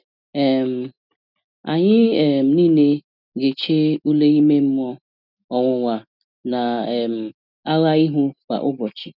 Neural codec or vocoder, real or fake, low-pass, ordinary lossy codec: none; real; 5.4 kHz; none